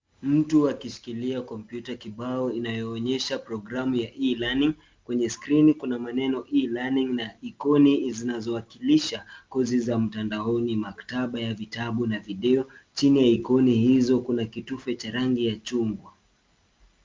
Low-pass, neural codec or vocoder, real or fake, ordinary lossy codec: 7.2 kHz; none; real; Opus, 24 kbps